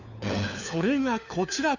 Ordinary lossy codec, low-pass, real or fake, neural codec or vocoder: none; 7.2 kHz; fake; codec, 16 kHz, 4 kbps, FunCodec, trained on LibriTTS, 50 frames a second